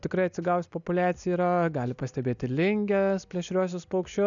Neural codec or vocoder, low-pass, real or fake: none; 7.2 kHz; real